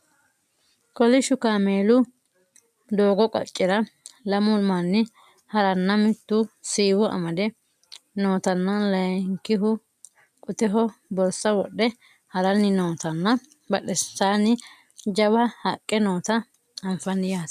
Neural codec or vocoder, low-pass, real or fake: none; 14.4 kHz; real